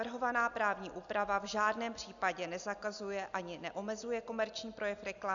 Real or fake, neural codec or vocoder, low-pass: real; none; 7.2 kHz